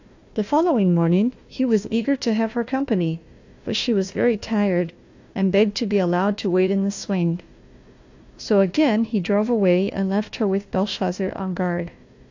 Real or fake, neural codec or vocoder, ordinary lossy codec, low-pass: fake; codec, 16 kHz, 1 kbps, FunCodec, trained on Chinese and English, 50 frames a second; AAC, 48 kbps; 7.2 kHz